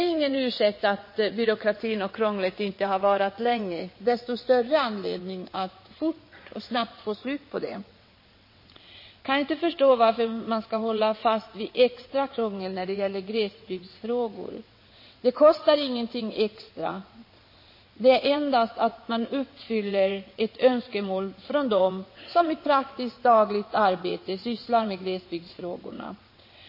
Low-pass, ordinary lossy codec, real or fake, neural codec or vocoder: 5.4 kHz; MP3, 24 kbps; fake; vocoder, 22.05 kHz, 80 mel bands, WaveNeXt